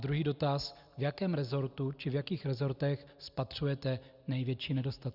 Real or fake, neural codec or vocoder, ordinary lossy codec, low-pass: real; none; Opus, 64 kbps; 5.4 kHz